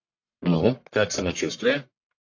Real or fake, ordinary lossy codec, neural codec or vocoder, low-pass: fake; AAC, 48 kbps; codec, 44.1 kHz, 1.7 kbps, Pupu-Codec; 7.2 kHz